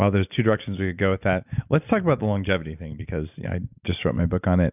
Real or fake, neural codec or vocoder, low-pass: real; none; 3.6 kHz